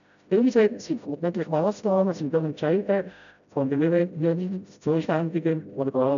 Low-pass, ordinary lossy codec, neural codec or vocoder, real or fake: 7.2 kHz; MP3, 96 kbps; codec, 16 kHz, 0.5 kbps, FreqCodec, smaller model; fake